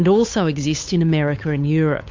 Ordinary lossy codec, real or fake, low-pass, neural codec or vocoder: MP3, 48 kbps; fake; 7.2 kHz; codec, 16 kHz, 8 kbps, FunCodec, trained on Chinese and English, 25 frames a second